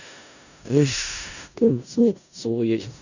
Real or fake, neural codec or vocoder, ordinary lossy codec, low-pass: fake; codec, 16 kHz in and 24 kHz out, 0.4 kbps, LongCat-Audio-Codec, four codebook decoder; none; 7.2 kHz